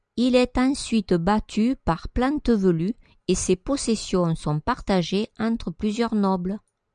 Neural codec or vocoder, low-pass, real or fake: none; 9.9 kHz; real